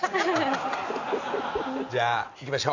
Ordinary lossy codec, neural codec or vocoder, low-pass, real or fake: none; none; 7.2 kHz; real